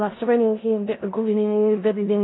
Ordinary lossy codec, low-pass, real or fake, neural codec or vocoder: AAC, 16 kbps; 7.2 kHz; fake; codec, 16 kHz in and 24 kHz out, 0.4 kbps, LongCat-Audio-Codec, four codebook decoder